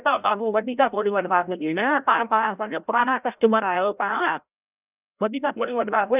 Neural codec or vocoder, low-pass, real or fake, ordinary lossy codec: codec, 16 kHz, 0.5 kbps, FreqCodec, larger model; 3.6 kHz; fake; none